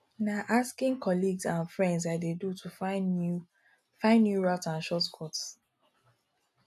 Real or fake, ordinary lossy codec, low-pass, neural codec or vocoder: real; none; 14.4 kHz; none